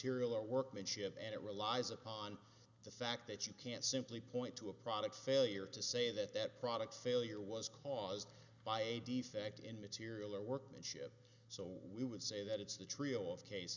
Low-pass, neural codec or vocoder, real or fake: 7.2 kHz; none; real